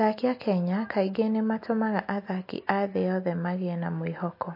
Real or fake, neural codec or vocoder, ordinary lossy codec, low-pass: real; none; MP3, 32 kbps; 5.4 kHz